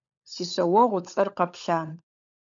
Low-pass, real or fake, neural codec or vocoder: 7.2 kHz; fake; codec, 16 kHz, 16 kbps, FunCodec, trained on LibriTTS, 50 frames a second